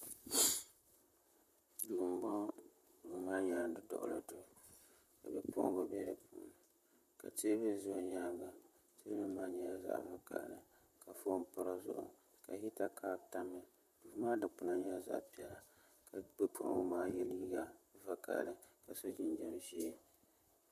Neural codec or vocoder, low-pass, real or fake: vocoder, 44.1 kHz, 128 mel bands, Pupu-Vocoder; 14.4 kHz; fake